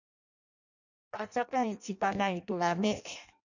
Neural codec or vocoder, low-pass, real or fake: codec, 16 kHz in and 24 kHz out, 0.6 kbps, FireRedTTS-2 codec; 7.2 kHz; fake